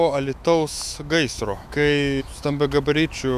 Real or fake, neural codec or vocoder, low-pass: fake; autoencoder, 48 kHz, 128 numbers a frame, DAC-VAE, trained on Japanese speech; 14.4 kHz